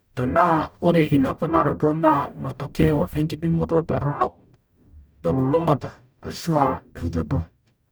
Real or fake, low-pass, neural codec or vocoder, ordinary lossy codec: fake; none; codec, 44.1 kHz, 0.9 kbps, DAC; none